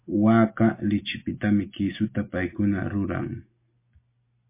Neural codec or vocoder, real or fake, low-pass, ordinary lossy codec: none; real; 3.6 kHz; MP3, 24 kbps